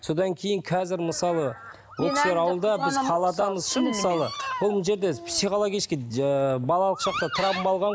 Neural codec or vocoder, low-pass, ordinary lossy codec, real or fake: none; none; none; real